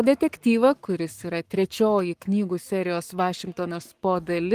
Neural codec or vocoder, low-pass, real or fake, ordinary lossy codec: codec, 44.1 kHz, 7.8 kbps, Pupu-Codec; 14.4 kHz; fake; Opus, 24 kbps